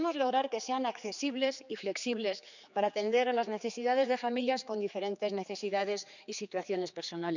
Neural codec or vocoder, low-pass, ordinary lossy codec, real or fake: codec, 16 kHz, 4 kbps, X-Codec, HuBERT features, trained on general audio; 7.2 kHz; none; fake